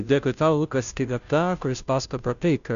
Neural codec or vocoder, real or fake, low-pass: codec, 16 kHz, 0.5 kbps, FunCodec, trained on Chinese and English, 25 frames a second; fake; 7.2 kHz